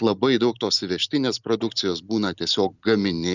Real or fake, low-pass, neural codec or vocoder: real; 7.2 kHz; none